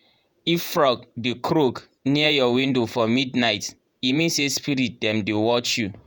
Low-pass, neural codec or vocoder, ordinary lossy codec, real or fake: none; vocoder, 48 kHz, 128 mel bands, Vocos; none; fake